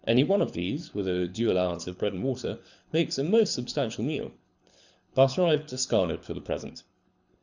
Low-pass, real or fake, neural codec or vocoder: 7.2 kHz; fake; codec, 24 kHz, 6 kbps, HILCodec